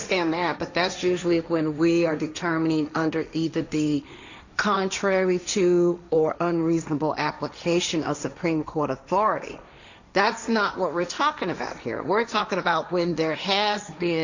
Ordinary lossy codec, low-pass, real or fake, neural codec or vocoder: Opus, 64 kbps; 7.2 kHz; fake; codec, 16 kHz, 1.1 kbps, Voila-Tokenizer